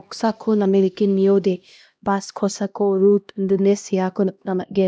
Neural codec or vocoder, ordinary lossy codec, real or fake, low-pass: codec, 16 kHz, 1 kbps, X-Codec, HuBERT features, trained on LibriSpeech; none; fake; none